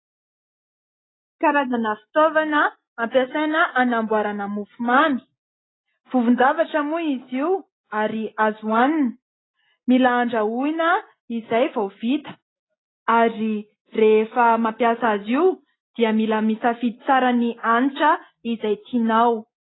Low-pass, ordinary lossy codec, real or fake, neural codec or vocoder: 7.2 kHz; AAC, 16 kbps; real; none